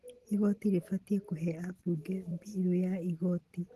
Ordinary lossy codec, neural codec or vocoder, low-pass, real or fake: Opus, 16 kbps; none; 14.4 kHz; real